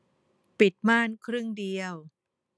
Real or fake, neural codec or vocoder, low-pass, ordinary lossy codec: real; none; none; none